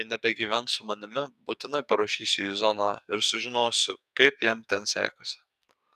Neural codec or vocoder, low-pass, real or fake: codec, 44.1 kHz, 2.6 kbps, SNAC; 14.4 kHz; fake